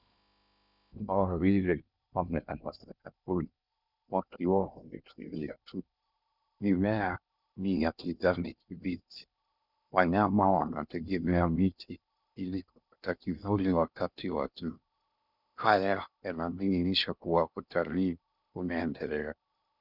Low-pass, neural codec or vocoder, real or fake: 5.4 kHz; codec, 16 kHz in and 24 kHz out, 0.6 kbps, FocalCodec, streaming, 2048 codes; fake